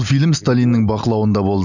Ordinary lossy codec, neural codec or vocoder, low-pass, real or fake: none; none; 7.2 kHz; real